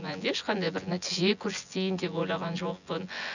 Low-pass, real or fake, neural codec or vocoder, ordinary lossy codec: 7.2 kHz; fake; vocoder, 24 kHz, 100 mel bands, Vocos; none